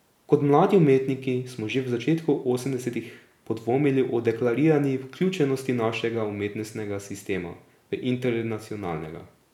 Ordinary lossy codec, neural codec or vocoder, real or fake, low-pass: none; none; real; 19.8 kHz